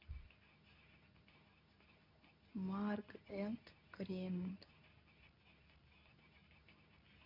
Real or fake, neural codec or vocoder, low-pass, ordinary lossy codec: fake; codec, 24 kHz, 0.9 kbps, WavTokenizer, medium speech release version 2; 5.4 kHz; none